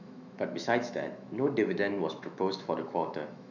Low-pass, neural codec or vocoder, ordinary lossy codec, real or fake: 7.2 kHz; autoencoder, 48 kHz, 128 numbers a frame, DAC-VAE, trained on Japanese speech; none; fake